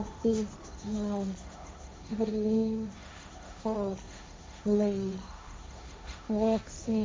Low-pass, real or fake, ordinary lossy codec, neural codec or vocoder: none; fake; none; codec, 16 kHz, 1.1 kbps, Voila-Tokenizer